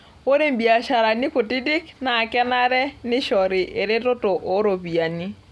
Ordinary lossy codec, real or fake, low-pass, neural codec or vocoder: none; real; none; none